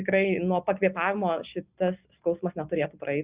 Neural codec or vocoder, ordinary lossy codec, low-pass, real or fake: none; Opus, 64 kbps; 3.6 kHz; real